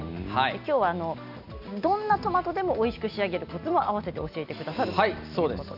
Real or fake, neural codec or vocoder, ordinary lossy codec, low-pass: real; none; none; 5.4 kHz